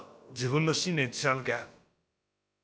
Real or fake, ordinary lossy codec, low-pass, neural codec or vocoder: fake; none; none; codec, 16 kHz, about 1 kbps, DyCAST, with the encoder's durations